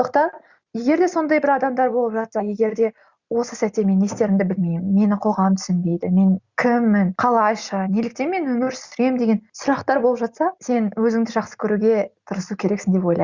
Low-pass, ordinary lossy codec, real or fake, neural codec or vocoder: 7.2 kHz; Opus, 64 kbps; real; none